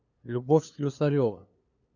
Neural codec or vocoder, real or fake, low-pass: codec, 16 kHz, 2 kbps, FunCodec, trained on LibriTTS, 25 frames a second; fake; 7.2 kHz